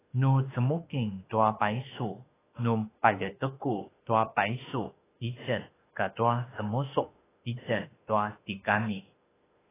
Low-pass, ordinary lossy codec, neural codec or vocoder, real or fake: 3.6 kHz; AAC, 16 kbps; autoencoder, 48 kHz, 32 numbers a frame, DAC-VAE, trained on Japanese speech; fake